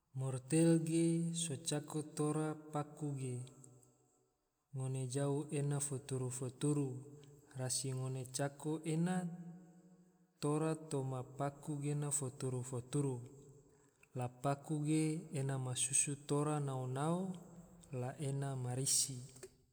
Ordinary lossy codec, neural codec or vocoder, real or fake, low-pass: none; none; real; none